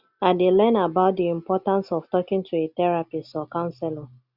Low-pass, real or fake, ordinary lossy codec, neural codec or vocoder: 5.4 kHz; real; Opus, 64 kbps; none